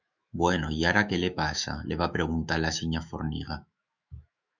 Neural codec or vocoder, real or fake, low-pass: autoencoder, 48 kHz, 128 numbers a frame, DAC-VAE, trained on Japanese speech; fake; 7.2 kHz